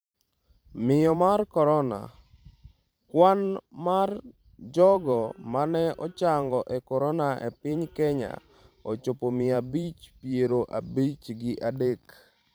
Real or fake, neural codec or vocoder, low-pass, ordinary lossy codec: fake; vocoder, 44.1 kHz, 128 mel bands every 512 samples, BigVGAN v2; none; none